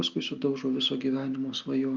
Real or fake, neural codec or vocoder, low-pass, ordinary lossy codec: real; none; 7.2 kHz; Opus, 24 kbps